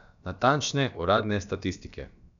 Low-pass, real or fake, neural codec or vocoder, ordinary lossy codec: 7.2 kHz; fake; codec, 16 kHz, about 1 kbps, DyCAST, with the encoder's durations; none